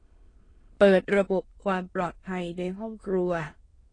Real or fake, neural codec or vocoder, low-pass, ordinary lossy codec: fake; autoencoder, 22.05 kHz, a latent of 192 numbers a frame, VITS, trained on many speakers; 9.9 kHz; AAC, 32 kbps